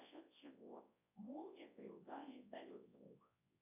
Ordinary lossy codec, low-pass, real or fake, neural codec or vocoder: AAC, 32 kbps; 3.6 kHz; fake; codec, 24 kHz, 0.9 kbps, WavTokenizer, large speech release